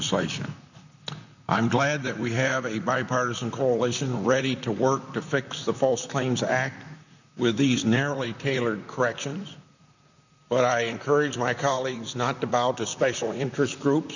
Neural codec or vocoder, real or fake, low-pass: vocoder, 44.1 kHz, 128 mel bands, Pupu-Vocoder; fake; 7.2 kHz